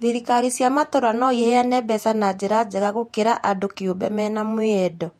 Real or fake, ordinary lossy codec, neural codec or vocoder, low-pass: fake; MP3, 64 kbps; vocoder, 48 kHz, 128 mel bands, Vocos; 19.8 kHz